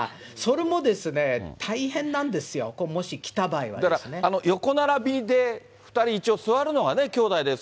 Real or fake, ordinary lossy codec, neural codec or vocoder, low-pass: real; none; none; none